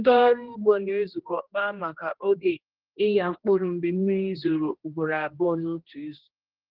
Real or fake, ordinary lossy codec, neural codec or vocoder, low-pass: fake; Opus, 16 kbps; codec, 16 kHz, 1 kbps, X-Codec, HuBERT features, trained on general audio; 5.4 kHz